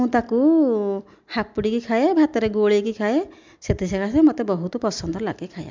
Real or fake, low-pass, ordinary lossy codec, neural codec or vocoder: real; 7.2 kHz; MP3, 64 kbps; none